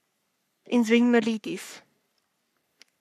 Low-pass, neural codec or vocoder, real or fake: 14.4 kHz; codec, 44.1 kHz, 3.4 kbps, Pupu-Codec; fake